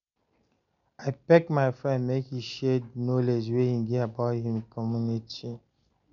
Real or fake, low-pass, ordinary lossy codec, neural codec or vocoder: real; 7.2 kHz; none; none